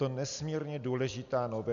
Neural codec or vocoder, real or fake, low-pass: none; real; 7.2 kHz